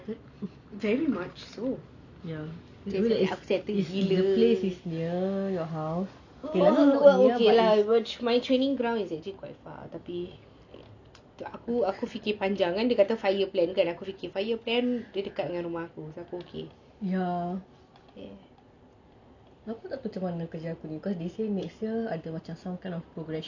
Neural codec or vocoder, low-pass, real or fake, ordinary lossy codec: none; 7.2 kHz; real; none